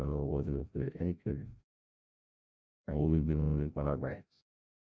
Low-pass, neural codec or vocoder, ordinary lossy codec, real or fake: none; codec, 16 kHz, 0.5 kbps, FreqCodec, larger model; none; fake